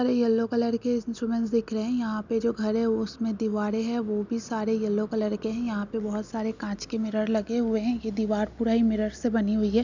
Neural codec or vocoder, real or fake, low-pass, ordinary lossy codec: none; real; 7.2 kHz; none